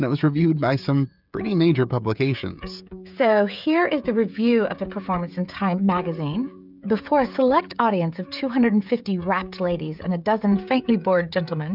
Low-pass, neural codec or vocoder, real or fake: 5.4 kHz; codec, 16 kHz, 16 kbps, FreqCodec, smaller model; fake